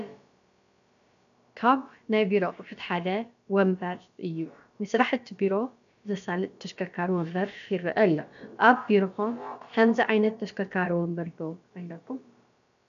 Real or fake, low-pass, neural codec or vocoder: fake; 7.2 kHz; codec, 16 kHz, about 1 kbps, DyCAST, with the encoder's durations